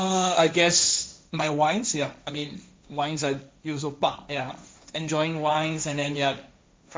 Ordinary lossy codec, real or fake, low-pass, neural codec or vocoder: none; fake; none; codec, 16 kHz, 1.1 kbps, Voila-Tokenizer